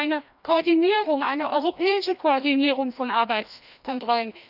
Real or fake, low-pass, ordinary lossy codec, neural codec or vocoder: fake; 5.4 kHz; none; codec, 16 kHz, 1 kbps, FreqCodec, larger model